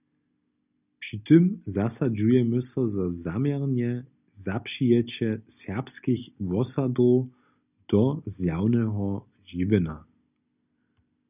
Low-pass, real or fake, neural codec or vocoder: 3.6 kHz; real; none